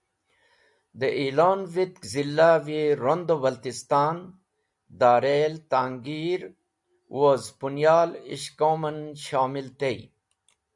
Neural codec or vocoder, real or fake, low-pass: none; real; 10.8 kHz